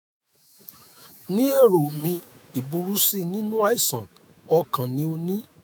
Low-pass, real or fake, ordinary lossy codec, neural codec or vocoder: none; fake; none; autoencoder, 48 kHz, 128 numbers a frame, DAC-VAE, trained on Japanese speech